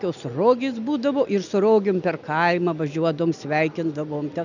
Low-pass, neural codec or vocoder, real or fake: 7.2 kHz; none; real